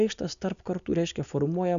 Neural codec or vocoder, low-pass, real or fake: none; 7.2 kHz; real